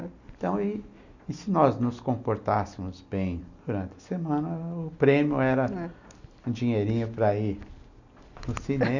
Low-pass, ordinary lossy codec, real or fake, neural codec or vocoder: 7.2 kHz; none; real; none